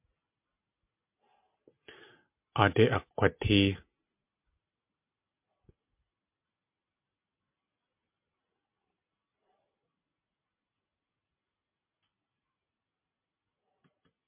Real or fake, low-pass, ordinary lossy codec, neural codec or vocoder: real; 3.6 kHz; MP3, 32 kbps; none